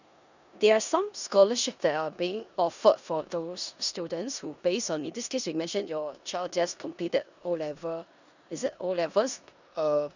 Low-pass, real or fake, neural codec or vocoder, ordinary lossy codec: 7.2 kHz; fake; codec, 16 kHz in and 24 kHz out, 0.9 kbps, LongCat-Audio-Codec, four codebook decoder; none